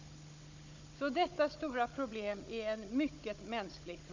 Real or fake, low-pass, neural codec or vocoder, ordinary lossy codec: fake; 7.2 kHz; codec, 16 kHz, 16 kbps, FunCodec, trained on Chinese and English, 50 frames a second; AAC, 48 kbps